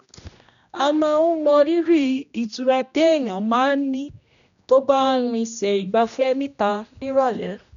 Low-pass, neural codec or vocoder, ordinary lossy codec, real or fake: 7.2 kHz; codec, 16 kHz, 1 kbps, X-Codec, HuBERT features, trained on general audio; none; fake